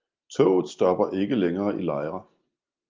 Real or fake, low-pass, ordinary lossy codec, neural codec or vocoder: real; 7.2 kHz; Opus, 24 kbps; none